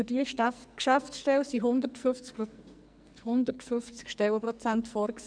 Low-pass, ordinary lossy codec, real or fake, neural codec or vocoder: 9.9 kHz; none; fake; codec, 44.1 kHz, 2.6 kbps, SNAC